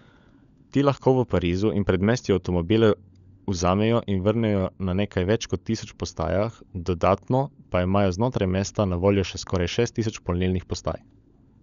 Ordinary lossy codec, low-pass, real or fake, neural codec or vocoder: none; 7.2 kHz; fake; codec, 16 kHz, 16 kbps, FunCodec, trained on LibriTTS, 50 frames a second